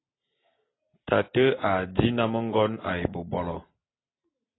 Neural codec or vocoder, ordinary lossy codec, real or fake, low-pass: none; AAC, 16 kbps; real; 7.2 kHz